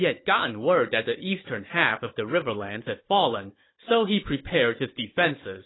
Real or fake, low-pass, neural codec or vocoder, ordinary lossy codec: fake; 7.2 kHz; codec, 16 kHz, 16 kbps, FunCodec, trained on Chinese and English, 50 frames a second; AAC, 16 kbps